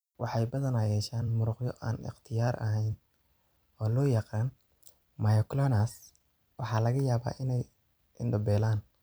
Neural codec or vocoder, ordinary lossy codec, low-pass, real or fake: vocoder, 44.1 kHz, 128 mel bands every 256 samples, BigVGAN v2; none; none; fake